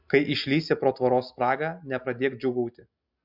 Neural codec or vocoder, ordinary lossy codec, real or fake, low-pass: none; MP3, 48 kbps; real; 5.4 kHz